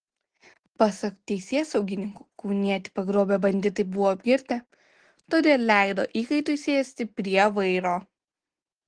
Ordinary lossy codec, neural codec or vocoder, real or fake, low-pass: Opus, 16 kbps; none; real; 9.9 kHz